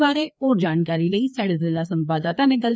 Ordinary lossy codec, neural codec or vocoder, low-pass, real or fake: none; codec, 16 kHz, 2 kbps, FreqCodec, larger model; none; fake